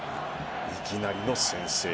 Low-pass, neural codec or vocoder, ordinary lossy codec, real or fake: none; none; none; real